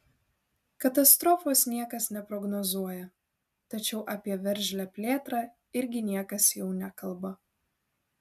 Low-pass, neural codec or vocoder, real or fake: 14.4 kHz; none; real